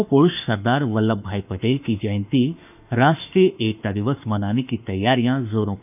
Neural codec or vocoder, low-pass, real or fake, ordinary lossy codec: autoencoder, 48 kHz, 32 numbers a frame, DAC-VAE, trained on Japanese speech; 3.6 kHz; fake; none